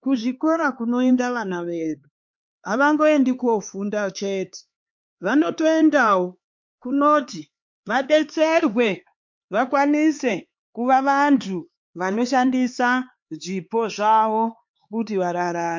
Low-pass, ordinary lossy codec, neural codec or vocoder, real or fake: 7.2 kHz; MP3, 48 kbps; codec, 16 kHz, 4 kbps, X-Codec, HuBERT features, trained on LibriSpeech; fake